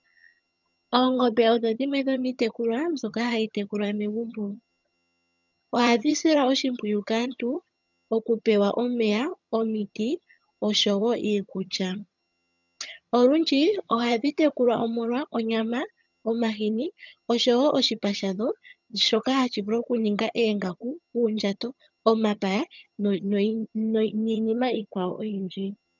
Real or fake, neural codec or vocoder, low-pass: fake; vocoder, 22.05 kHz, 80 mel bands, HiFi-GAN; 7.2 kHz